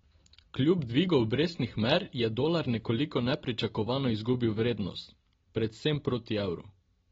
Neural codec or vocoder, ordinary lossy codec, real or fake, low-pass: none; AAC, 24 kbps; real; 7.2 kHz